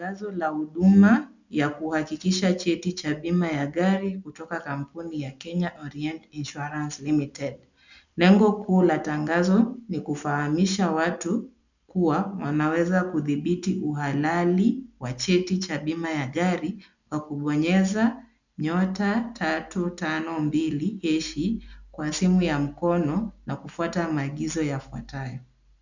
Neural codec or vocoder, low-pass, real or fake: none; 7.2 kHz; real